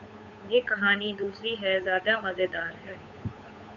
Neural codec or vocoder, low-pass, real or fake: codec, 16 kHz, 8 kbps, FunCodec, trained on Chinese and English, 25 frames a second; 7.2 kHz; fake